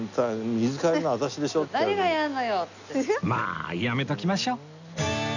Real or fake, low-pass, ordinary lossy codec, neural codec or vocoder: real; 7.2 kHz; none; none